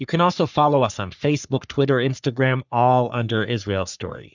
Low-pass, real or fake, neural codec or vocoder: 7.2 kHz; fake; codec, 44.1 kHz, 3.4 kbps, Pupu-Codec